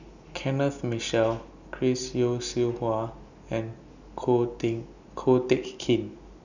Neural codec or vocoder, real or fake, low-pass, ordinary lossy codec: none; real; 7.2 kHz; none